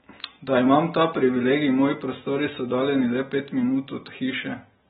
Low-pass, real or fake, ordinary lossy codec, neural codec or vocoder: 19.8 kHz; real; AAC, 16 kbps; none